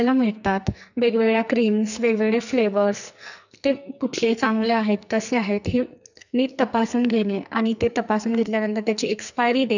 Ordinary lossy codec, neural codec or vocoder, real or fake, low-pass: none; codec, 44.1 kHz, 2.6 kbps, SNAC; fake; 7.2 kHz